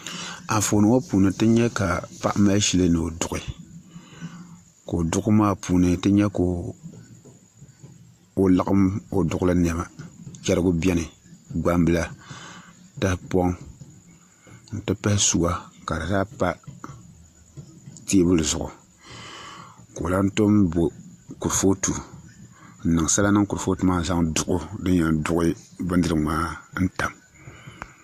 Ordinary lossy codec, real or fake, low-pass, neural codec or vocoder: AAC, 64 kbps; real; 14.4 kHz; none